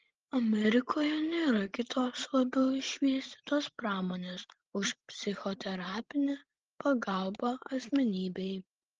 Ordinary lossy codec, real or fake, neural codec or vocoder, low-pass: Opus, 16 kbps; fake; codec, 16 kHz, 16 kbps, FreqCodec, larger model; 7.2 kHz